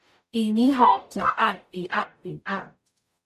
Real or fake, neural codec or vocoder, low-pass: fake; codec, 44.1 kHz, 0.9 kbps, DAC; 14.4 kHz